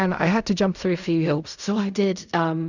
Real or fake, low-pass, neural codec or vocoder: fake; 7.2 kHz; codec, 16 kHz in and 24 kHz out, 0.4 kbps, LongCat-Audio-Codec, fine tuned four codebook decoder